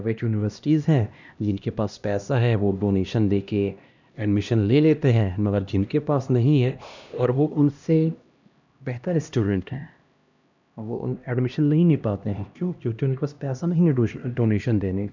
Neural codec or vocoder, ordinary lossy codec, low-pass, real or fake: codec, 16 kHz, 1 kbps, X-Codec, HuBERT features, trained on LibriSpeech; none; 7.2 kHz; fake